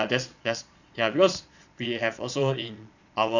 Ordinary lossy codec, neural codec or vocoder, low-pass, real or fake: none; vocoder, 22.05 kHz, 80 mel bands, Vocos; 7.2 kHz; fake